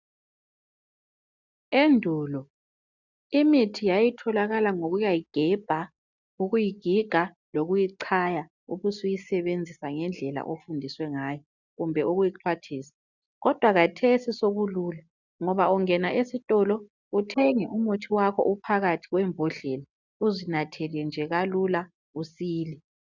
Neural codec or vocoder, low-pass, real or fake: none; 7.2 kHz; real